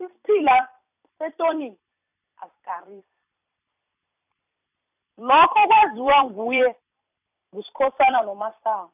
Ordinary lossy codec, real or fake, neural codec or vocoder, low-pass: none; fake; vocoder, 44.1 kHz, 128 mel bands every 256 samples, BigVGAN v2; 3.6 kHz